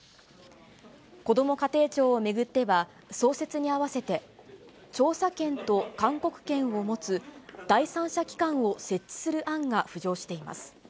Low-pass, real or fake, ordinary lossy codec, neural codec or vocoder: none; real; none; none